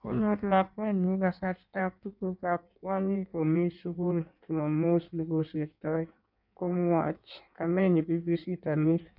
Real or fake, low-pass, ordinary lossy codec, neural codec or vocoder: fake; 5.4 kHz; none; codec, 16 kHz in and 24 kHz out, 1.1 kbps, FireRedTTS-2 codec